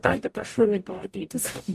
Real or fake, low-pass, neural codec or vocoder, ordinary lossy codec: fake; 14.4 kHz; codec, 44.1 kHz, 0.9 kbps, DAC; MP3, 64 kbps